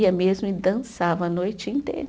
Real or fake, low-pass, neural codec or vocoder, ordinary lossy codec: real; none; none; none